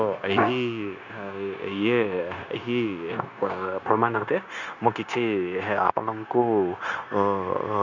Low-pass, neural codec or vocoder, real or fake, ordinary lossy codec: 7.2 kHz; codec, 16 kHz, 0.9 kbps, LongCat-Audio-Codec; fake; none